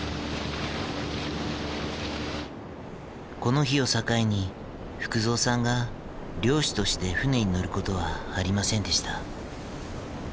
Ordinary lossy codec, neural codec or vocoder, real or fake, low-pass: none; none; real; none